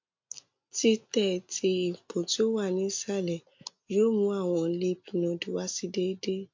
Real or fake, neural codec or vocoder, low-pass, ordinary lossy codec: real; none; 7.2 kHz; MP3, 48 kbps